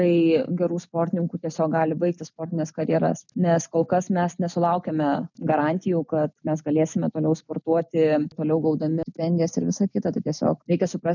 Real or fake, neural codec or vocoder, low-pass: real; none; 7.2 kHz